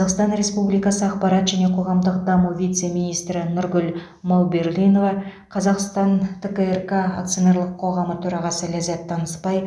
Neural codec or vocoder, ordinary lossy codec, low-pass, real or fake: none; none; none; real